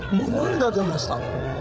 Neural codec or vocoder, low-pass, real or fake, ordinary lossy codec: codec, 16 kHz, 16 kbps, FreqCodec, larger model; none; fake; none